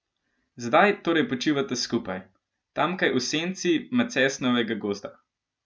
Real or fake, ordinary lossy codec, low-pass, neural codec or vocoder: real; none; none; none